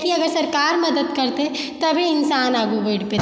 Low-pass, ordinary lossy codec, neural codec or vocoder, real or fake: none; none; none; real